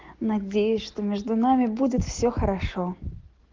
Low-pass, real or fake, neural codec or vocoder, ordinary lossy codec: 7.2 kHz; real; none; Opus, 16 kbps